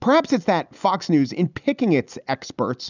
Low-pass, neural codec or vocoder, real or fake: 7.2 kHz; none; real